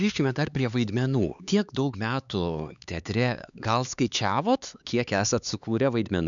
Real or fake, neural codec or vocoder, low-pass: fake; codec, 16 kHz, 4 kbps, X-Codec, HuBERT features, trained on LibriSpeech; 7.2 kHz